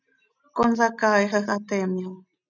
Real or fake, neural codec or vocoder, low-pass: real; none; 7.2 kHz